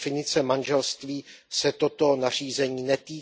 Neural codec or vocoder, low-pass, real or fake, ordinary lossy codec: none; none; real; none